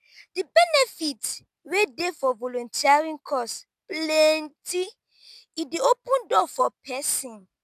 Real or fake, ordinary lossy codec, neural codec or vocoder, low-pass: real; none; none; 14.4 kHz